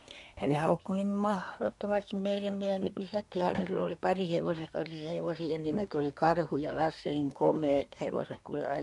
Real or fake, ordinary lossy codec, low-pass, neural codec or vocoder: fake; none; 10.8 kHz; codec, 24 kHz, 1 kbps, SNAC